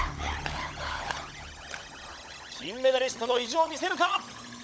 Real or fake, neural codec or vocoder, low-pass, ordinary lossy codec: fake; codec, 16 kHz, 16 kbps, FunCodec, trained on LibriTTS, 50 frames a second; none; none